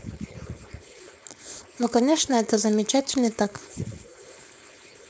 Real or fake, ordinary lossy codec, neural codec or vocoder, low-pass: fake; none; codec, 16 kHz, 4.8 kbps, FACodec; none